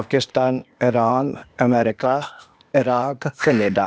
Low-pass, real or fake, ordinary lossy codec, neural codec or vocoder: none; fake; none; codec, 16 kHz, 0.8 kbps, ZipCodec